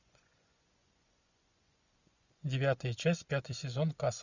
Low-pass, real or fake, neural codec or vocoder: 7.2 kHz; fake; vocoder, 24 kHz, 100 mel bands, Vocos